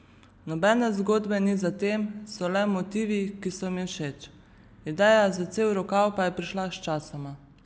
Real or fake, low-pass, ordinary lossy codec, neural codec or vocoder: real; none; none; none